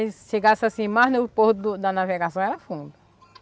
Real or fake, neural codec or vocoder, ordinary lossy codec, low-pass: real; none; none; none